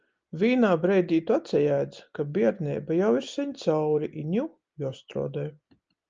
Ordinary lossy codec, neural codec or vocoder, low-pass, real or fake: Opus, 24 kbps; none; 7.2 kHz; real